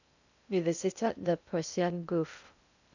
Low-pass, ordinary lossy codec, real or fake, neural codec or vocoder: 7.2 kHz; none; fake; codec, 16 kHz in and 24 kHz out, 0.6 kbps, FocalCodec, streaming, 2048 codes